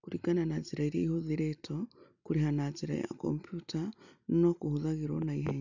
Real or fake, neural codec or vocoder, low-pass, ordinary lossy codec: real; none; 7.2 kHz; none